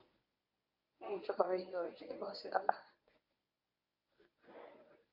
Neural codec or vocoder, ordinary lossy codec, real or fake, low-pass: codec, 24 kHz, 0.9 kbps, WavTokenizer, medium speech release version 1; none; fake; 5.4 kHz